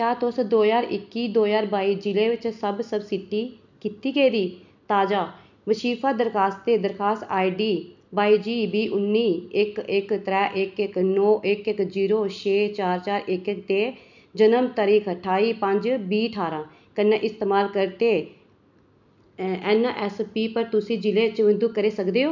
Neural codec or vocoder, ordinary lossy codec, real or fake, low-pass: none; none; real; 7.2 kHz